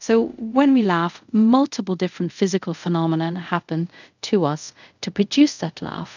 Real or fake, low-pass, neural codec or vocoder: fake; 7.2 kHz; codec, 24 kHz, 0.5 kbps, DualCodec